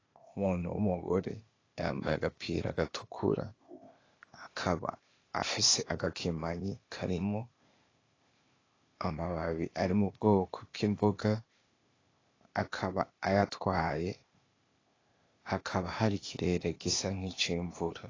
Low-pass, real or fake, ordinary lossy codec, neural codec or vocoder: 7.2 kHz; fake; AAC, 32 kbps; codec, 16 kHz, 0.8 kbps, ZipCodec